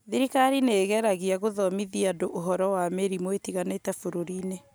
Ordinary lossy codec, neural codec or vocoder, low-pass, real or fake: none; none; none; real